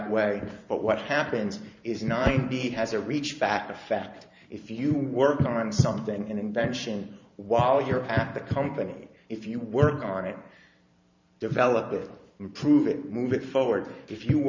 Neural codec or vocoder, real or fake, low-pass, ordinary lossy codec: vocoder, 44.1 kHz, 128 mel bands every 512 samples, BigVGAN v2; fake; 7.2 kHz; MP3, 64 kbps